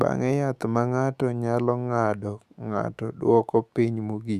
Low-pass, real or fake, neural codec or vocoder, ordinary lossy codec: 14.4 kHz; fake; autoencoder, 48 kHz, 128 numbers a frame, DAC-VAE, trained on Japanese speech; Opus, 64 kbps